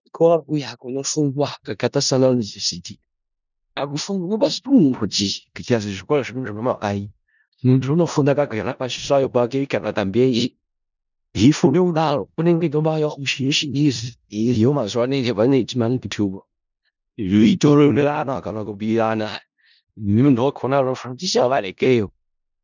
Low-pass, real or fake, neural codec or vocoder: 7.2 kHz; fake; codec, 16 kHz in and 24 kHz out, 0.4 kbps, LongCat-Audio-Codec, four codebook decoder